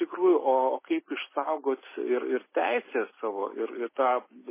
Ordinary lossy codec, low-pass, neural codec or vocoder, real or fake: MP3, 16 kbps; 3.6 kHz; none; real